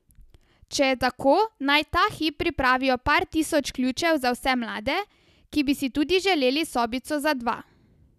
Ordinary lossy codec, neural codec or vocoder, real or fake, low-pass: none; none; real; 14.4 kHz